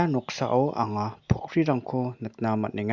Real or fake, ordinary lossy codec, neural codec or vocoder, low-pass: real; Opus, 64 kbps; none; 7.2 kHz